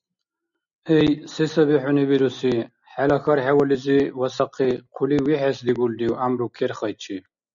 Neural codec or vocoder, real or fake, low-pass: none; real; 7.2 kHz